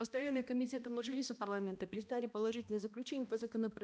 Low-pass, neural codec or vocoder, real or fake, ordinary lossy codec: none; codec, 16 kHz, 1 kbps, X-Codec, HuBERT features, trained on balanced general audio; fake; none